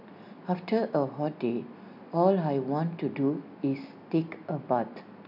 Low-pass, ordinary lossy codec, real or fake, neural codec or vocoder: 5.4 kHz; none; real; none